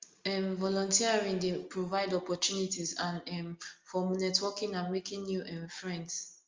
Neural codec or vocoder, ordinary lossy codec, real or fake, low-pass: none; Opus, 32 kbps; real; 7.2 kHz